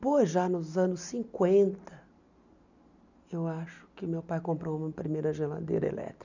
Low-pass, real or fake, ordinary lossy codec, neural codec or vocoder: 7.2 kHz; real; none; none